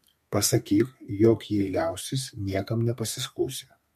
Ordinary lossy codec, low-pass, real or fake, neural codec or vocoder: MP3, 64 kbps; 14.4 kHz; fake; codec, 32 kHz, 1.9 kbps, SNAC